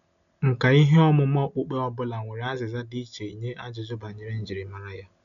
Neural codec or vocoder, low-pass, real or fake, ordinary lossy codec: none; 7.2 kHz; real; none